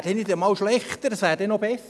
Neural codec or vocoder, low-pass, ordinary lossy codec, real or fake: none; none; none; real